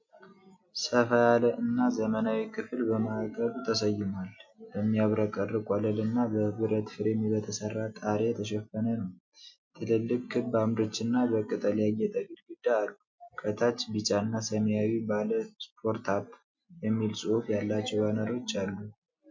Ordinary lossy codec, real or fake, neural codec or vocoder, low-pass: MP3, 64 kbps; real; none; 7.2 kHz